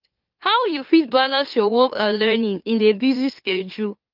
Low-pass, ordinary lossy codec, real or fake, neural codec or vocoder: 5.4 kHz; Opus, 24 kbps; fake; autoencoder, 44.1 kHz, a latent of 192 numbers a frame, MeloTTS